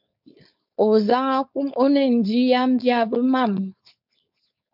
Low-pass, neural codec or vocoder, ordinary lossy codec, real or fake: 5.4 kHz; codec, 16 kHz, 4.8 kbps, FACodec; MP3, 48 kbps; fake